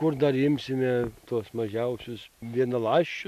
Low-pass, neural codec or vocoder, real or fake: 14.4 kHz; none; real